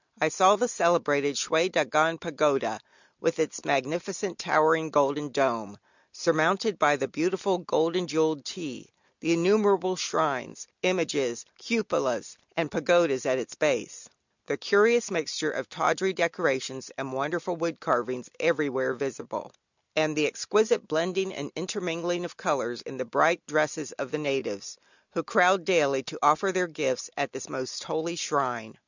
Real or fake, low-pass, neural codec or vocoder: real; 7.2 kHz; none